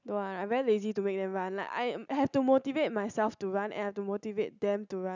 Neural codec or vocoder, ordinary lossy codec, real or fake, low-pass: none; none; real; 7.2 kHz